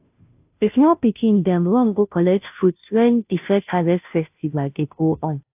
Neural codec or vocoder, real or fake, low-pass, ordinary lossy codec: codec, 16 kHz, 0.5 kbps, FunCodec, trained on Chinese and English, 25 frames a second; fake; 3.6 kHz; AAC, 32 kbps